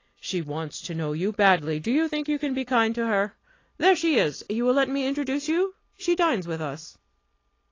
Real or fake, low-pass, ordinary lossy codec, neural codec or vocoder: real; 7.2 kHz; AAC, 32 kbps; none